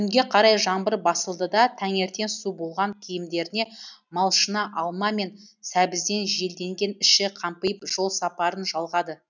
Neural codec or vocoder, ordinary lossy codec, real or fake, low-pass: none; none; real; 7.2 kHz